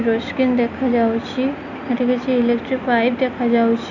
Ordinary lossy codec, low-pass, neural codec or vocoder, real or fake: none; 7.2 kHz; none; real